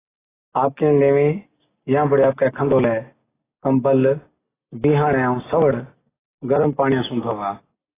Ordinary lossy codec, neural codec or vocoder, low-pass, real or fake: AAC, 16 kbps; none; 3.6 kHz; real